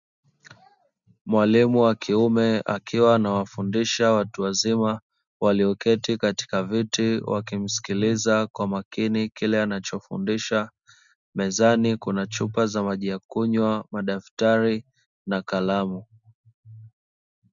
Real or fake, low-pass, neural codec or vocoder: real; 7.2 kHz; none